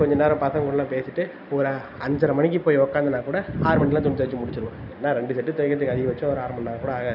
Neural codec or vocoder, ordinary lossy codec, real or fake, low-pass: none; none; real; 5.4 kHz